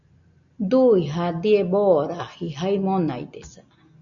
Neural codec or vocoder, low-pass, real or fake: none; 7.2 kHz; real